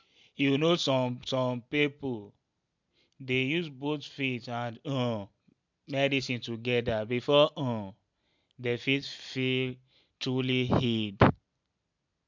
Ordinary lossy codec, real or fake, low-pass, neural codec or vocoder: MP3, 64 kbps; real; 7.2 kHz; none